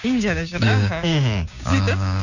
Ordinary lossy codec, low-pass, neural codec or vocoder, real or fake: none; 7.2 kHz; codec, 16 kHz, 6 kbps, DAC; fake